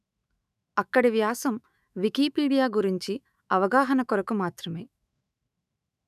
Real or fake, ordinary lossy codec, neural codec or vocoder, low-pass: fake; none; autoencoder, 48 kHz, 128 numbers a frame, DAC-VAE, trained on Japanese speech; 14.4 kHz